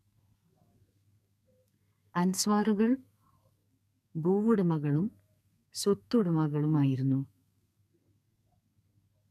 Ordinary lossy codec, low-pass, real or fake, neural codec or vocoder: none; 14.4 kHz; fake; codec, 32 kHz, 1.9 kbps, SNAC